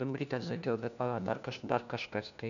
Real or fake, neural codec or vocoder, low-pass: fake; codec, 16 kHz, 1 kbps, FunCodec, trained on LibriTTS, 50 frames a second; 7.2 kHz